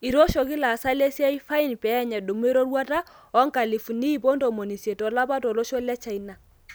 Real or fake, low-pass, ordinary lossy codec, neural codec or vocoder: real; none; none; none